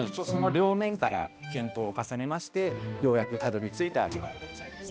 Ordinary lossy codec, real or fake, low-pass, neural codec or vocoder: none; fake; none; codec, 16 kHz, 1 kbps, X-Codec, HuBERT features, trained on balanced general audio